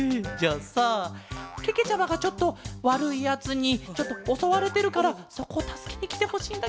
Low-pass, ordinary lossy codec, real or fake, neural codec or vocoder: none; none; real; none